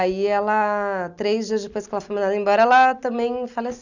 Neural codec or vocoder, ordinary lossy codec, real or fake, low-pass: none; none; real; 7.2 kHz